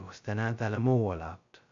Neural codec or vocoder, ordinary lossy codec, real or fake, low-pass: codec, 16 kHz, 0.2 kbps, FocalCodec; AAC, 64 kbps; fake; 7.2 kHz